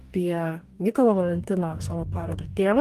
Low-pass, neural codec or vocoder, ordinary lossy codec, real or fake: 14.4 kHz; codec, 44.1 kHz, 2.6 kbps, DAC; Opus, 32 kbps; fake